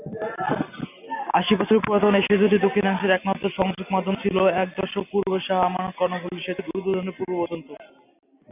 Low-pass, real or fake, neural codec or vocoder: 3.6 kHz; real; none